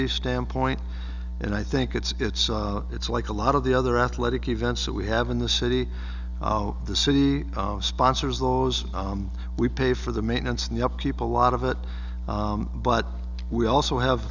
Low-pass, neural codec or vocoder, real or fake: 7.2 kHz; none; real